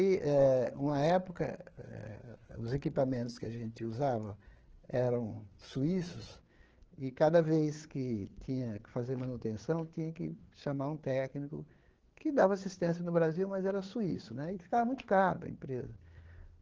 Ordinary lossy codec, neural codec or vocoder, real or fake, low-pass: Opus, 16 kbps; codec, 16 kHz, 4 kbps, FreqCodec, larger model; fake; 7.2 kHz